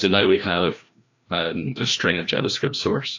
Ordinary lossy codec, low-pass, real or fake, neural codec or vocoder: AAC, 48 kbps; 7.2 kHz; fake; codec, 16 kHz, 1 kbps, FreqCodec, larger model